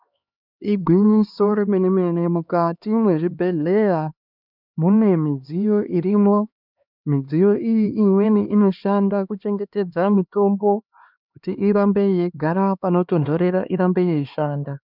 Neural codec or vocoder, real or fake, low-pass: codec, 16 kHz, 2 kbps, X-Codec, HuBERT features, trained on LibriSpeech; fake; 5.4 kHz